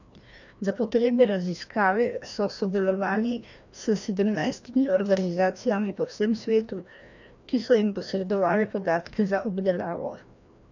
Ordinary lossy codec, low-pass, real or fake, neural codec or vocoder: none; 7.2 kHz; fake; codec, 16 kHz, 1 kbps, FreqCodec, larger model